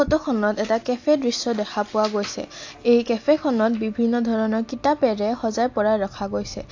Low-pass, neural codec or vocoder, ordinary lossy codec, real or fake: 7.2 kHz; none; AAC, 48 kbps; real